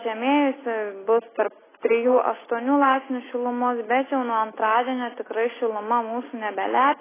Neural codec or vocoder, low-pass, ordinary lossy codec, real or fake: none; 3.6 kHz; AAC, 16 kbps; real